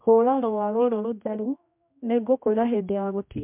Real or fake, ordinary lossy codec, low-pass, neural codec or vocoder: fake; MP3, 32 kbps; 3.6 kHz; codec, 16 kHz, 1 kbps, X-Codec, HuBERT features, trained on general audio